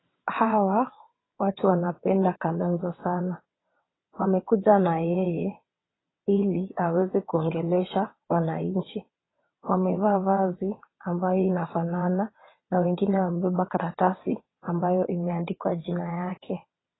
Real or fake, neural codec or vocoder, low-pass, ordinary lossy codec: fake; vocoder, 22.05 kHz, 80 mel bands, WaveNeXt; 7.2 kHz; AAC, 16 kbps